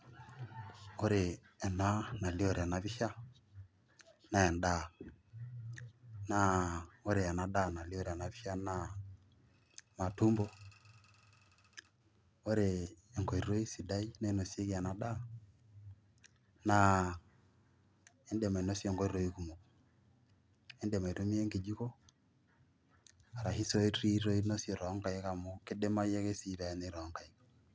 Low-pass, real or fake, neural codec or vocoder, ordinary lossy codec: none; real; none; none